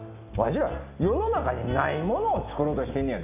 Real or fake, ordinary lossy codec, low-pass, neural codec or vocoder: real; none; 3.6 kHz; none